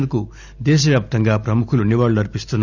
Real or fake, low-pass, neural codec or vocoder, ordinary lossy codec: real; 7.2 kHz; none; none